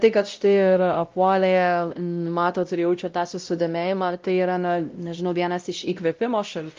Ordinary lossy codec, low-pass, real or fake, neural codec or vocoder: Opus, 24 kbps; 7.2 kHz; fake; codec, 16 kHz, 0.5 kbps, X-Codec, WavLM features, trained on Multilingual LibriSpeech